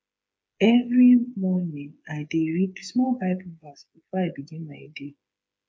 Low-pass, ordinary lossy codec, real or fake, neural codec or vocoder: none; none; fake; codec, 16 kHz, 8 kbps, FreqCodec, smaller model